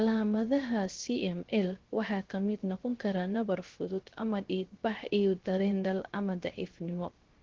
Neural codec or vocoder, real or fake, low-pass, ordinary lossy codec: codec, 16 kHz, 0.3 kbps, FocalCodec; fake; 7.2 kHz; Opus, 16 kbps